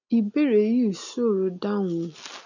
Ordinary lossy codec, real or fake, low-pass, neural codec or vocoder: none; real; 7.2 kHz; none